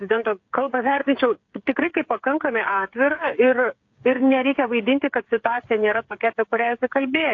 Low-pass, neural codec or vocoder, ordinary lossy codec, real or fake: 7.2 kHz; codec, 16 kHz, 8 kbps, FreqCodec, smaller model; AAC, 48 kbps; fake